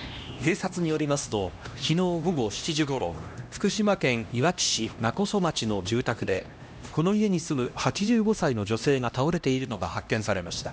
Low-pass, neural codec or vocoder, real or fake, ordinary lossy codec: none; codec, 16 kHz, 1 kbps, X-Codec, HuBERT features, trained on LibriSpeech; fake; none